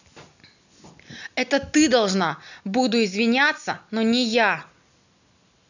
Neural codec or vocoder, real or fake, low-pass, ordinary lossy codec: none; real; 7.2 kHz; none